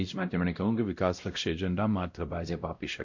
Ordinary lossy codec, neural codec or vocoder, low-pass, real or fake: MP3, 48 kbps; codec, 16 kHz, 0.5 kbps, X-Codec, WavLM features, trained on Multilingual LibriSpeech; 7.2 kHz; fake